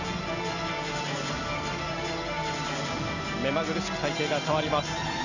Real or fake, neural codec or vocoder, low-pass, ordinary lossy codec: real; none; 7.2 kHz; none